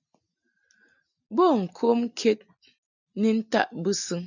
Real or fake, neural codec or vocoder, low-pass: real; none; 7.2 kHz